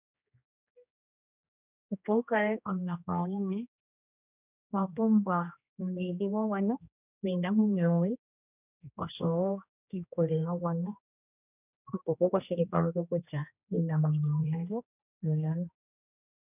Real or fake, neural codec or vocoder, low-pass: fake; codec, 16 kHz, 1 kbps, X-Codec, HuBERT features, trained on general audio; 3.6 kHz